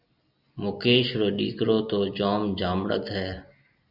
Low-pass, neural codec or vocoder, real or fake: 5.4 kHz; none; real